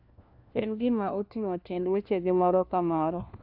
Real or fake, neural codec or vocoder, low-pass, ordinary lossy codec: fake; codec, 16 kHz, 1 kbps, FunCodec, trained on LibriTTS, 50 frames a second; 5.4 kHz; AAC, 48 kbps